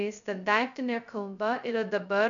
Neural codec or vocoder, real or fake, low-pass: codec, 16 kHz, 0.2 kbps, FocalCodec; fake; 7.2 kHz